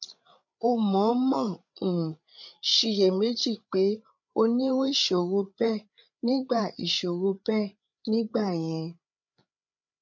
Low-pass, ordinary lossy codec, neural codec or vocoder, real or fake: 7.2 kHz; none; codec, 16 kHz, 8 kbps, FreqCodec, larger model; fake